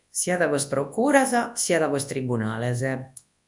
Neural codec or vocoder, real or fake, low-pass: codec, 24 kHz, 0.9 kbps, WavTokenizer, large speech release; fake; 10.8 kHz